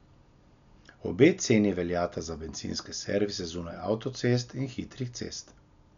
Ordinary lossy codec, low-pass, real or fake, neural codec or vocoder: none; 7.2 kHz; real; none